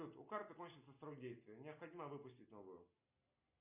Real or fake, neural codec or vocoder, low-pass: real; none; 3.6 kHz